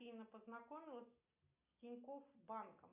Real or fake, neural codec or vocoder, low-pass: real; none; 3.6 kHz